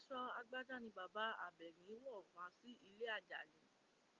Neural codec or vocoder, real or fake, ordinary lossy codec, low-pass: none; real; Opus, 32 kbps; 7.2 kHz